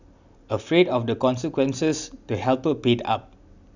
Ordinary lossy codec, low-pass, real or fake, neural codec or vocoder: none; 7.2 kHz; real; none